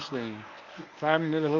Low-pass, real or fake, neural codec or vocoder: 7.2 kHz; fake; codec, 24 kHz, 0.9 kbps, WavTokenizer, medium speech release version 1